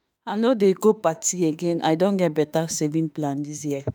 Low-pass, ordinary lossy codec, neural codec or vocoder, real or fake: none; none; autoencoder, 48 kHz, 32 numbers a frame, DAC-VAE, trained on Japanese speech; fake